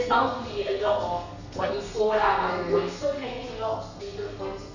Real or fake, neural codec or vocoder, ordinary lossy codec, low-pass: fake; codec, 32 kHz, 1.9 kbps, SNAC; none; 7.2 kHz